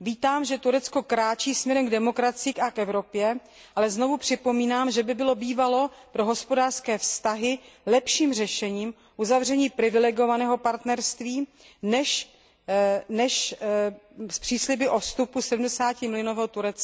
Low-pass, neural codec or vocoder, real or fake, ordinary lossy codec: none; none; real; none